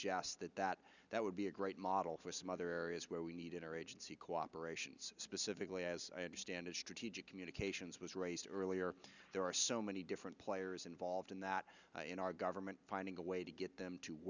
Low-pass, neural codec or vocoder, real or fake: 7.2 kHz; none; real